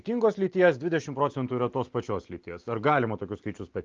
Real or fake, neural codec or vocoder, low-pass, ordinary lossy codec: real; none; 7.2 kHz; Opus, 32 kbps